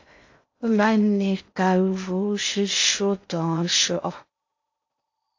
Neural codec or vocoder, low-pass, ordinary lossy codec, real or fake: codec, 16 kHz in and 24 kHz out, 0.6 kbps, FocalCodec, streaming, 2048 codes; 7.2 kHz; AAC, 48 kbps; fake